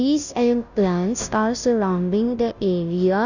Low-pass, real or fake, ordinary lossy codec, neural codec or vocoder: 7.2 kHz; fake; none; codec, 16 kHz, 0.5 kbps, FunCodec, trained on Chinese and English, 25 frames a second